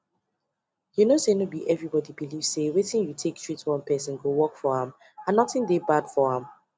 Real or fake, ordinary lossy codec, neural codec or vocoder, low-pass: real; none; none; none